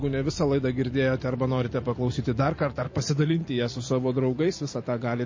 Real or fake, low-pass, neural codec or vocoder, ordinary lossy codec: fake; 7.2 kHz; vocoder, 44.1 kHz, 128 mel bands every 256 samples, BigVGAN v2; MP3, 32 kbps